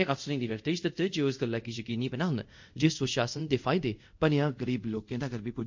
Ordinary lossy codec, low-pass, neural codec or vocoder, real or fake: MP3, 64 kbps; 7.2 kHz; codec, 24 kHz, 0.5 kbps, DualCodec; fake